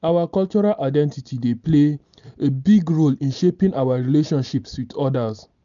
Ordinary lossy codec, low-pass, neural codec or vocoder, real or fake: none; 7.2 kHz; none; real